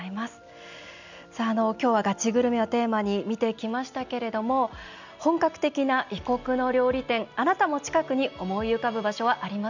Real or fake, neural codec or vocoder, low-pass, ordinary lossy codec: real; none; 7.2 kHz; none